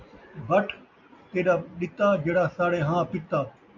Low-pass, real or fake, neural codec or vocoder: 7.2 kHz; real; none